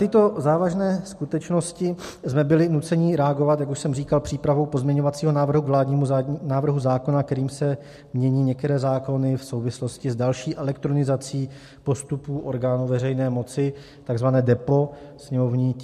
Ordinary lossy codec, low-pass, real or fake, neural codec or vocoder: MP3, 64 kbps; 14.4 kHz; real; none